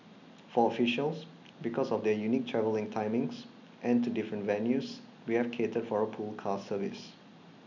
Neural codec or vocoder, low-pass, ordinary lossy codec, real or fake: none; 7.2 kHz; none; real